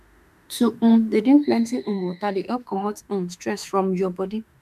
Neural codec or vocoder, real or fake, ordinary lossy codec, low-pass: autoencoder, 48 kHz, 32 numbers a frame, DAC-VAE, trained on Japanese speech; fake; none; 14.4 kHz